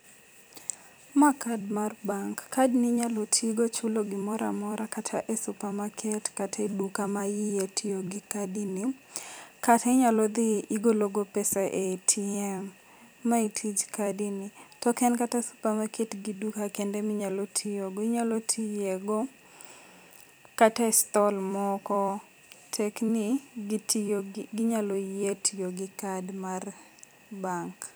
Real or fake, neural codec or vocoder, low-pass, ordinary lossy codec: fake; vocoder, 44.1 kHz, 128 mel bands every 256 samples, BigVGAN v2; none; none